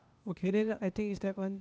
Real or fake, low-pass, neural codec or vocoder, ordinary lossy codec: fake; none; codec, 16 kHz, 0.8 kbps, ZipCodec; none